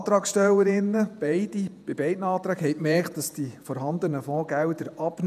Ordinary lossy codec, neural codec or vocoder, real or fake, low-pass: none; vocoder, 48 kHz, 128 mel bands, Vocos; fake; 14.4 kHz